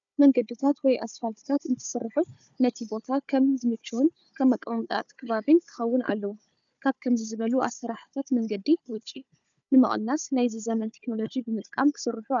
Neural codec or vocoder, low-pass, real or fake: codec, 16 kHz, 4 kbps, FunCodec, trained on Chinese and English, 50 frames a second; 7.2 kHz; fake